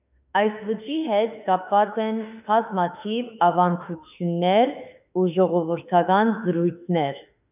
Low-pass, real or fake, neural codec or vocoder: 3.6 kHz; fake; autoencoder, 48 kHz, 32 numbers a frame, DAC-VAE, trained on Japanese speech